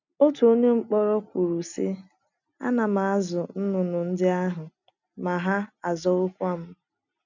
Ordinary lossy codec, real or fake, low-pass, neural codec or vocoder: none; real; 7.2 kHz; none